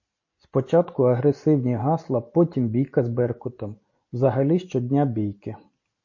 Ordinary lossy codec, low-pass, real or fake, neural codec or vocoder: MP3, 32 kbps; 7.2 kHz; real; none